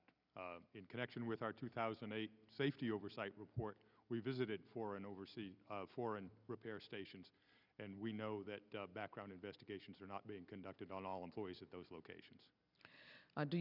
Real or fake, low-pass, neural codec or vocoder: real; 5.4 kHz; none